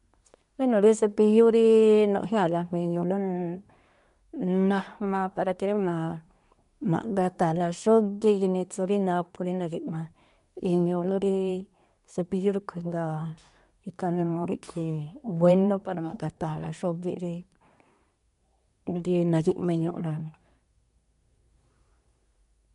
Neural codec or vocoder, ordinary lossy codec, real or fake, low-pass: codec, 24 kHz, 1 kbps, SNAC; MP3, 64 kbps; fake; 10.8 kHz